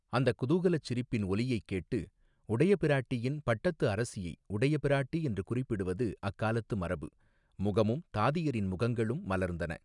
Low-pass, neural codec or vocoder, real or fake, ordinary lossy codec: 10.8 kHz; none; real; none